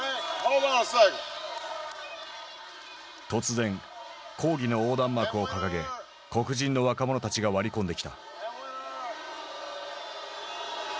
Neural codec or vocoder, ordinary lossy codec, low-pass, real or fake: none; none; none; real